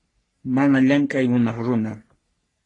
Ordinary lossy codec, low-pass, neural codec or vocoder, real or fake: AAC, 32 kbps; 10.8 kHz; codec, 44.1 kHz, 3.4 kbps, Pupu-Codec; fake